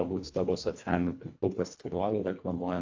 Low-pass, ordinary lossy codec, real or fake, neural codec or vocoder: 7.2 kHz; AAC, 48 kbps; fake; codec, 24 kHz, 1.5 kbps, HILCodec